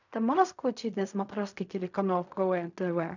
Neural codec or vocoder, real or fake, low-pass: codec, 16 kHz in and 24 kHz out, 0.4 kbps, LongCat-Audio-Codec, fine tuned four codebook decoder; fake; 7.2 kHz